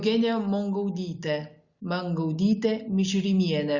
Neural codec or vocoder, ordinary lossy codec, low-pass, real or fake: none; Opus, 64 kbps; 7.2 kHz; real